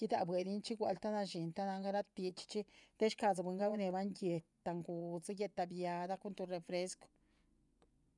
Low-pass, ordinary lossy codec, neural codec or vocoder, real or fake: 10.8 kHz; none; vocoder, 24 kHz, 100 mel bands, Vocos; fake